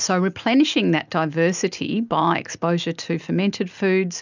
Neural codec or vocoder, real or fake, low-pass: none; real; 7.2 kHz